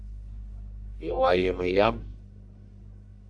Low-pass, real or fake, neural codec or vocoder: 10.8 kHz; fake; codec, 44.1 kHz, 1.7 kbps, Pupu-Codec